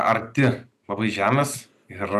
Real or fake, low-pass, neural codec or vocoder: real; 14.4 kHz; none